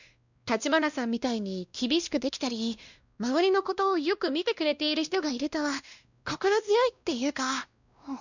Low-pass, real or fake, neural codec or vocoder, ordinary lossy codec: 7.2 kHz; fake; codec, 16 kHz, 1 kbps, X-Codec, WavLM features, trained on Multilingual LibriSpeech; none